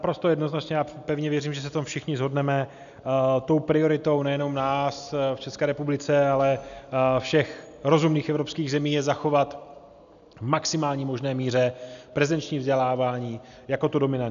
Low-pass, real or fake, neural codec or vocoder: 7.2 kHz; real; none